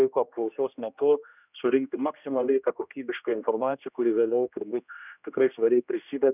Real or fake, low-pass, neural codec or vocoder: fake; 3.6 kHz; codec, 16 kHz, 1 kbps, X-Codec, HuBERT features, trained on general audio